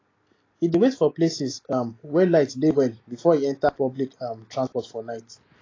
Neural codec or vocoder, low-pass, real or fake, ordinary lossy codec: none; 7.2 kHz; real; AAC, 32 kbps